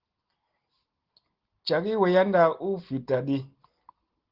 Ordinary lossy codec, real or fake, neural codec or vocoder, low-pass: Opus, 16 kbps; real; none; 5.4 kHz